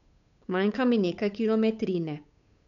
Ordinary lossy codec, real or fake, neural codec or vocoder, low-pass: none; fake; codec, 16 kHz, 8 kbps, FunCodec, trained on Chinese and English, 25 frames a second; 7.2 kHz